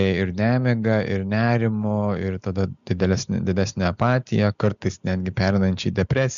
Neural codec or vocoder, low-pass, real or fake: none; 7.2 kHz; real